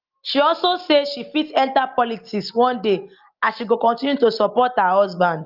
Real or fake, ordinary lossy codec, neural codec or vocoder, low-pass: real; Opus, 24 kbps; none; 5.4 kHz